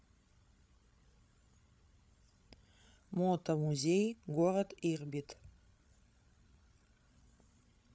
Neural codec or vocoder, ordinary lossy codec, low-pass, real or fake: codec, 16 kHz, 16 kbps, FreqCodec, larger model; none; none; fake